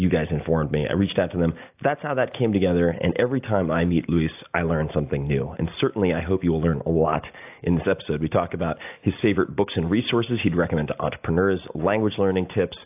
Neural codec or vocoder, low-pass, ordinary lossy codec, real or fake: none; 3.6 kHz; AAC, 32 kbps; real